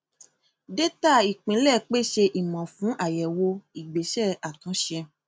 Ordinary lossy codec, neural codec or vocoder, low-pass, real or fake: none; none; none; real